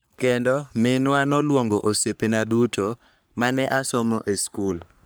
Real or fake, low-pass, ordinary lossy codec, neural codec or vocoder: fake; none; none; codec, 44.1 kHz, 3.4 kbps, Pupu-Codec